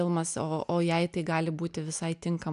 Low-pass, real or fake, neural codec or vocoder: 10.8 kHz; real; none